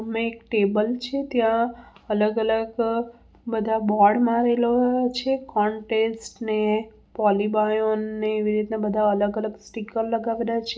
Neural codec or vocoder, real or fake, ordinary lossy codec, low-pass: none; real; none; none